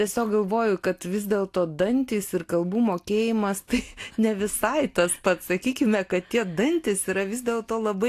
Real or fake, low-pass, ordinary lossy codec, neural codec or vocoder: real; 14.4 kHz; AAC, 64 kbps; none